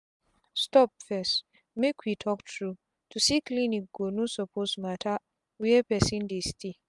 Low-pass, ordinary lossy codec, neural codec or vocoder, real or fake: 10.8 kHz; MP3, 96 kbps; none; real